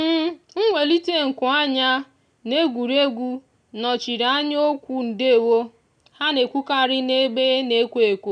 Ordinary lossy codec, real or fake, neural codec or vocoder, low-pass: none; real; none; 9.9 kHz